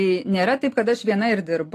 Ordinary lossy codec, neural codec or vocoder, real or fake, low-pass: AAC, 48 kbps; none; real; 14.4 kHz